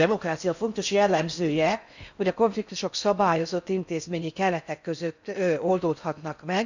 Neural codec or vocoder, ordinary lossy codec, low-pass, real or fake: codec, 16 kHz in and 24 kHz out, 0.6 kbps, FocalCodec, streaming, 4096 codes; none; 7.2 kHz; fake